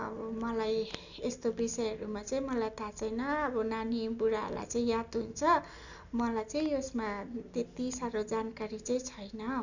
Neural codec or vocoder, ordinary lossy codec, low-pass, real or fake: none; none; 7.2 kHz; real